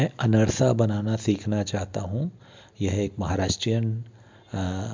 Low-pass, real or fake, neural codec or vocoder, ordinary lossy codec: 7.2 kHz; real; none; MP3, 64 kbps